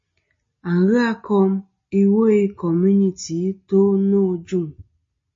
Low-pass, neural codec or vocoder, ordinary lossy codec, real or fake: 7.2 kHz; none; MP3, 32 kbps; real